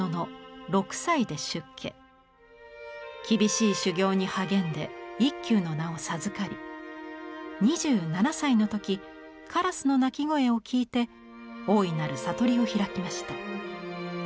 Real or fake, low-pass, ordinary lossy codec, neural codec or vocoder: real; none; none; none